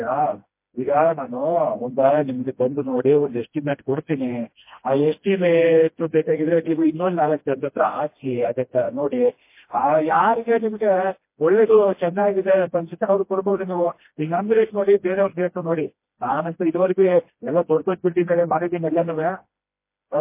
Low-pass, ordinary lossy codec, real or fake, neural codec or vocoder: 3.6 kHz; MP3, 24 kbps; fake; codec, 16 kHz, 1 kbps, FreqCodec, smaller model